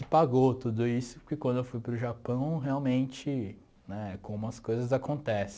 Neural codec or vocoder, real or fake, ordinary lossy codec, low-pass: none; real; none; none